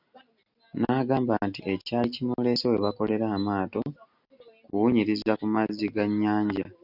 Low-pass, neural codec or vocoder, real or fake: 5.4 kHz; none; real